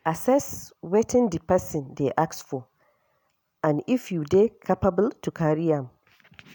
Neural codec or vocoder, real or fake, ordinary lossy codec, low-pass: none; real; none; none